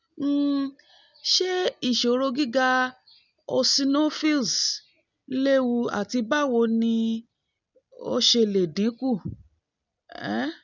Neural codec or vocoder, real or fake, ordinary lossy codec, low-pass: none; real; none; 7.2 kHz